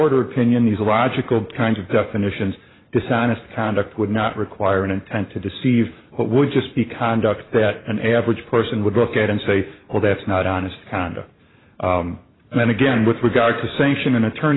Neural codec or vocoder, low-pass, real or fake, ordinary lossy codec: vocoder, 44.1 kHz, 128 mel bands every 512 samples, BigVGAN v2; 7.2 kHz; fake; AAC, 16 kbps